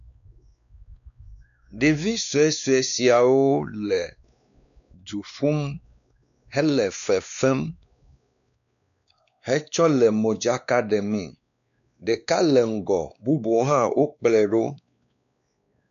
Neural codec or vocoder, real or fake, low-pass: codec, 16 kHz, 2 kbps, X-Codec, WavLM features, trained on Multilingual LibriSpeech; fake; 7.2 kHz